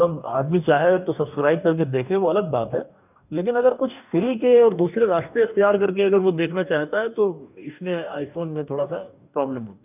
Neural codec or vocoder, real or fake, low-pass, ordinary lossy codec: codec, 44.1 kHz, 2.6 kbps, DAC; fake; 3.6 kHz; none